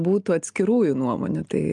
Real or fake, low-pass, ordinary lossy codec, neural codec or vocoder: real; 10.8 kHz; Opus, 32 kbps; none